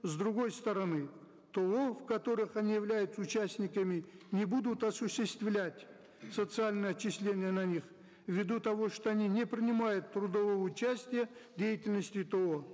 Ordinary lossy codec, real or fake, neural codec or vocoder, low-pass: none; real; none; none